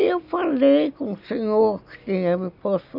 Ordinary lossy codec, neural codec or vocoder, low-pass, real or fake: none; none; 5.4 kHz; real